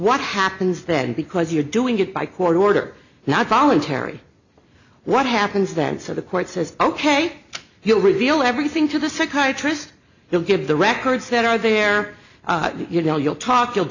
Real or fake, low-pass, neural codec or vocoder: real; 7.2 kHz; none